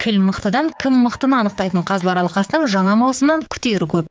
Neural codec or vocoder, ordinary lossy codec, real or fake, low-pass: codec, 16 kHz, 4 kbps, X-Codec, HuBERT features, trained on general audio; none; fake; none